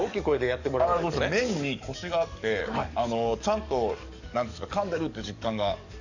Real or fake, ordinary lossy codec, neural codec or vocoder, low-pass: fake; none; codec, 44.1 kHz, 7.8 kbps, DAC; 7.2 kHz